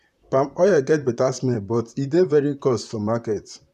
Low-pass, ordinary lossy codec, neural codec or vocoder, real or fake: 14.4 kHz; none; vocoder, 44.1 kHz, 128 mel bands, Pupu-Vocoder; fake